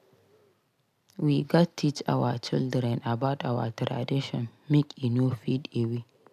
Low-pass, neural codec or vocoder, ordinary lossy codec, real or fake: 14.4 kHz; none; none; real